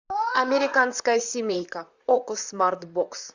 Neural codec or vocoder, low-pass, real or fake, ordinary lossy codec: vocoder, 44.1 kHz, 128 mel bands, Pupu-Vocoder; 7.2 kHz; fake; Opus, 64 kbps